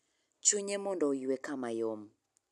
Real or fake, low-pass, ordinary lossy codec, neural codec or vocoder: real; none; none; none